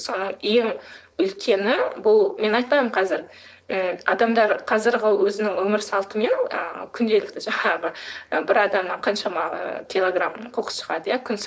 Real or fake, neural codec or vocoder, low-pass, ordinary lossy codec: fake; codec, 16 kHz, 4.8 kbps, FACodec; none; none